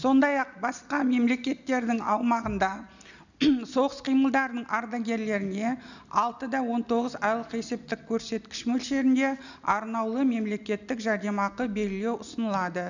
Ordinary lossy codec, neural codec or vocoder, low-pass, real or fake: none; none; 7.2 kHz; real